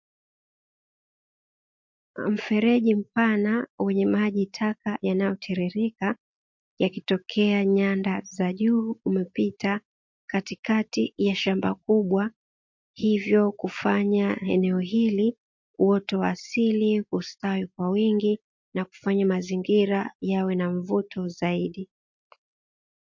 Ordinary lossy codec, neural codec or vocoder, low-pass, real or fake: MP3, 48 kbps; none; 7.2 kHz; real